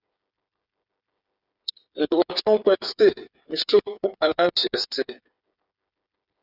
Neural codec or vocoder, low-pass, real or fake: codec, 16 kHz, 8 kbps, FreqCodec, smaller model; 5.4 kHz; fake